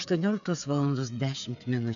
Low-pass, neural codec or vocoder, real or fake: 7.2 kHz; codec, 16 kHz, 8 kbps, FreqCodec, smaller model; fake